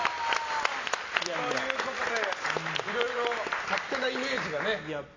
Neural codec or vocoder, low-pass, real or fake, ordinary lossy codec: none; 7.2 kHz; real; AAC, 32 kbps